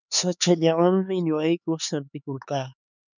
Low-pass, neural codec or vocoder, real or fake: 7.2 kHz; codec, 16 kHz, 4 kbps, X-Codec, HuBERT features, trained on LibriSpeech; fake